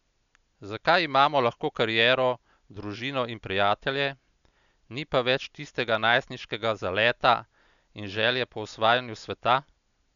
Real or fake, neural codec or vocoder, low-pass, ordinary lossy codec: real; none; 7.2 kHz; none